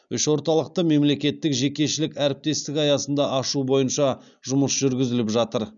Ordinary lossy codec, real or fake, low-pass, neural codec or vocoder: none; real; 7.2 kHz; none